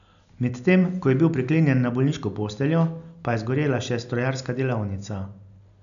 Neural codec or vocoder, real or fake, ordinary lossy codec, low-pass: none; real; none; 7.2 kHz